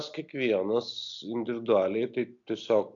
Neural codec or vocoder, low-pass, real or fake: none; 7.2 kHz; real